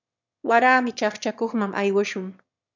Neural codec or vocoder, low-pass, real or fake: autoencoder, 22.05 kHz, a latent of 192 numbers a frame, VITS, trained on one speaker; 7.2 kHz; fake